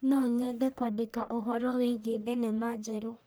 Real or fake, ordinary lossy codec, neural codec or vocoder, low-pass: fake; none; codec, 44.1 kHz, 1.7 kbps, Pupu-Codec; none